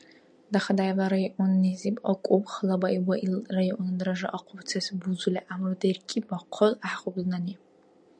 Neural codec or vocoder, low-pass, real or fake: none; 9.9 kHz; real